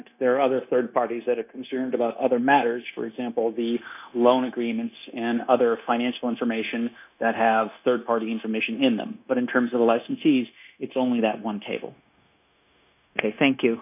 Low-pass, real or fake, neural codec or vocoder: 3.6 kHz; fake; codec, 16 kHz, 0.9 kbps, LongCat-Audio-Codec